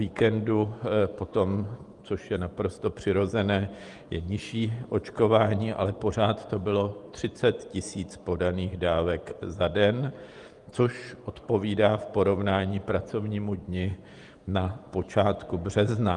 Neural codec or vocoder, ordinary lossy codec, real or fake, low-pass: vocoder, 48 kHz, 128 mel bands, Vocos; Opus, 32 kbps; fake; 10.8 kHz